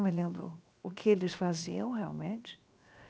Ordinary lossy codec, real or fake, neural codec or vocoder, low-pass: none; fake; codec, 16 kHz, 0.7 kbps, FocalCodec; none